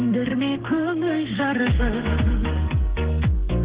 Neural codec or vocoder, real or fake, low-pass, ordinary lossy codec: codec, 32 kHz, 1.9 kbps, SNAC; fake; 3.6 kHz; Opus, 16 kbps